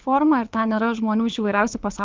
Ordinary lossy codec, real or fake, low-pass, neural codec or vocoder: Opus, 32 kbps; fake; 7.2 kHz; codec, 24 kHz, 6 kbps, HILCodec